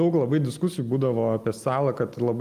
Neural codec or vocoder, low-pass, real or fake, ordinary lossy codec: none; 14.4 kHz; real; Opus, 32 kbps